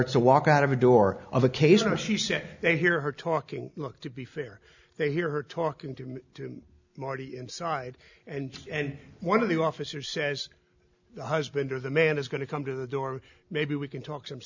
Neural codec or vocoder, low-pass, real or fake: none; 7.2 kHz; real